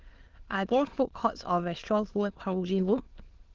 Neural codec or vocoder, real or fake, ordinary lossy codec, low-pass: autoencoder, 22.05 kHz, a latent of 192 numbers a frame, VITS, trained on many speakers; fake; Opus, 16 kbps; 7.2 kHz